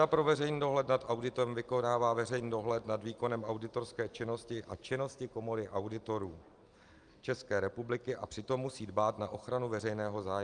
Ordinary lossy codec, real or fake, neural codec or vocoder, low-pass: Opus, 32 kbps; real; none; 9.9 kHz